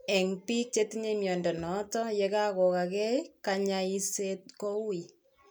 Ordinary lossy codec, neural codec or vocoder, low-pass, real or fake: none; none; none; real